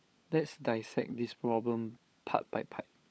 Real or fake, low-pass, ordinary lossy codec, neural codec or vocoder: fake; none; none; codec, 16 kHz, 16 kbps, FunCodec, trained on LibriTTS, 50 frames a second